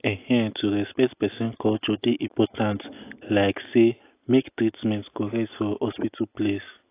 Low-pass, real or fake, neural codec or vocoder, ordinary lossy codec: 3.6 kHz; real; none; AAC, 24 kbps